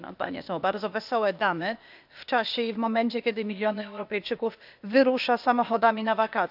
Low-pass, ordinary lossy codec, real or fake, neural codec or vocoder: 5.4 kHz; none; fake; codec, 16 kHz, 0.8 kbps, ZipCodec